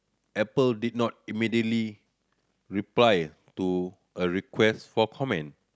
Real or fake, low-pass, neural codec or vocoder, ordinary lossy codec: real; none; none; none